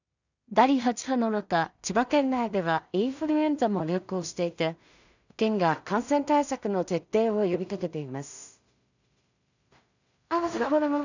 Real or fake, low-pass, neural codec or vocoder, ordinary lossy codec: fake; 7.2 kHz; codec, 16 kHz in and 24 kHz out, 0.4 kbps, LongCat-Audio-Codec, two codebook decoder; none